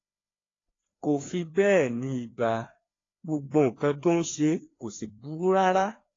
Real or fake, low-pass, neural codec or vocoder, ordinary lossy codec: fake; 7.2 kHz; codec, 16 kHz, 2 kbps, FreqCodec, larger model; AAC, 32 kbps